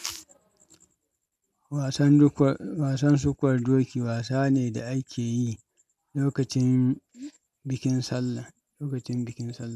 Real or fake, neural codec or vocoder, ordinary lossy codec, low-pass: real; none; MP3, 96 kbps; 14.4 kHz